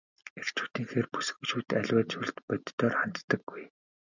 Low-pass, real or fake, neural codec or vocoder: 7.2 kHz; real; none